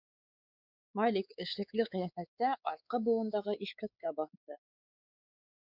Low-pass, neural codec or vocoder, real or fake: 5.4 kHz; codec, 16 kHz, 4 kbps, X-Codec, WavLM features, trained on Multilingual LibriSpeech; fake